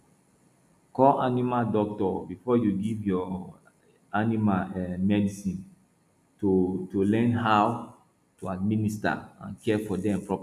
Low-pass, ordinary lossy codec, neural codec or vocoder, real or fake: 14.4 kHz; none; none; real